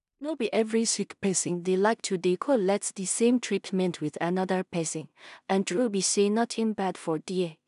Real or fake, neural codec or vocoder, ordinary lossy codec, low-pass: fake; codec, 16 kHz in and 24 kHz out, 0.4 kbps, LongCat-Audio-Codec, two codebook decoder; none; 10.8 kHz